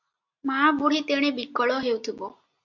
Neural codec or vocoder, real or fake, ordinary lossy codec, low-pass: none; real; MP3, 48 kbps; 7.2 kHz